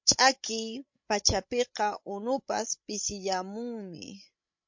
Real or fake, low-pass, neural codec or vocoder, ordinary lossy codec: fake; 7.2 kHz; codec, 16 kHz, 16 kbps, FreqCodec, larger model; MP3, 48 kbps